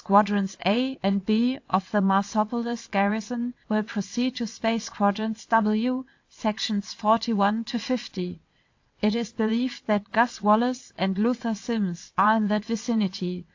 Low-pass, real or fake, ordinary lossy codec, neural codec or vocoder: 7.2 kHz; fake; AAC, 48 kbps; vocoder, 22.05 kHz, 80 mel bands, WaveNeXt